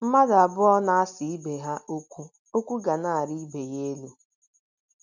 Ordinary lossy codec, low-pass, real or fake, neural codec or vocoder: none; 7.2 kHz; real; none